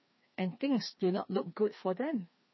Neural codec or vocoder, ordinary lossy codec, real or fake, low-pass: codec, 16 kHz, 2 kbps, FreqCodec, larger model; MP3, 24 kbps; fake; 7.2 kHz